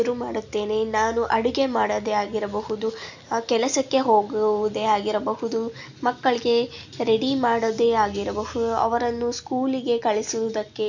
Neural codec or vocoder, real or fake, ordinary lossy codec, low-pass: none; real; none; 7.2 kHz